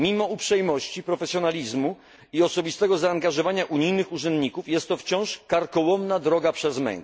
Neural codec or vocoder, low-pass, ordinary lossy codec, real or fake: none; none; none; real